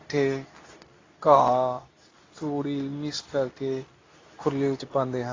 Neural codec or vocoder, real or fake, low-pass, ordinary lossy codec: codec, 24 kHz, 0.9 kbps, WavTokenizer, medium speech release version 2; fake; 7.2 kHz; AAC, 32 kbps